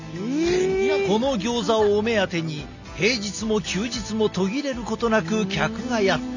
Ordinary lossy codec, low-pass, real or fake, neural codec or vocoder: none; 7.2 kHz; real; none